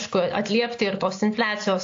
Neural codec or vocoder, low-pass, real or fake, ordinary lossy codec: none; 7.2 kHz; real; AAC, 48 kbps